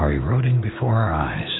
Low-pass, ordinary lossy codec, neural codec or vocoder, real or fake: 7.2 kHz; AAC, 16 kbps; autoencoder, 48 kHz, 128 numbers a frame, DAC-VAE, trained on Japanese speech; fake